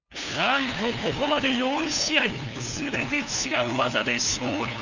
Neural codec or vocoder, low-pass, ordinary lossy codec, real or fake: codec, 16 kHz, 2 kbps, FunCodec, trained on LibriTTS, 25 frames a second; 7.2 kHz; none; fake